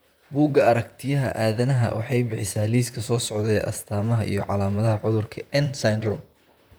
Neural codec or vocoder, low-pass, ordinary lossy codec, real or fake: vocoder, 44.1 kHz, 128 mel bands, Pupu-Vocoder; none; none; fake